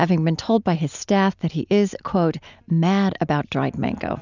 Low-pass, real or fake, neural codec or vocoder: 7.2 kHz; real; none